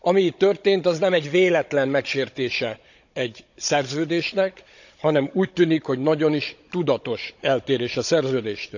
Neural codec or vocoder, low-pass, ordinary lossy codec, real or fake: codec, 16 kHz, 16 kbps, FunCodec, trained on Chinese and English, 50 frames a second; 7.2 kHz; none; fake